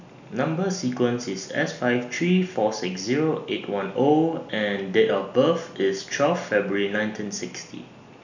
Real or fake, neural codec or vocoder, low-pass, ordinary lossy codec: real; none; 7.2 kHz; none